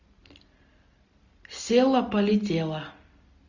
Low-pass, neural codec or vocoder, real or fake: 7.2 kHz; none; real